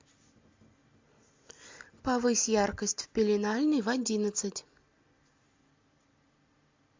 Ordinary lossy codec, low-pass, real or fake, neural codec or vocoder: MP3, 64 kbps; 7.2 kHz; real; none